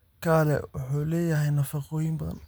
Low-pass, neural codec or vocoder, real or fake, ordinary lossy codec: none; none; real; none